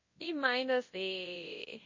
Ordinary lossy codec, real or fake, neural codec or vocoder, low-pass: MP3, 32 kbps; fake; codec, 24 kHz, 0.9 kbps, WavTokenizer, large speech release; 7.2 kHz